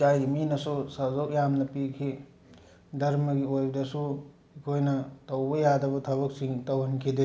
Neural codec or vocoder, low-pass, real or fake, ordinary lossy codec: none; none; real; none